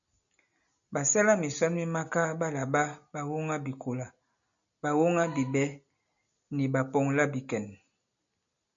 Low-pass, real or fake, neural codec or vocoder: 7.2 kHz; real; none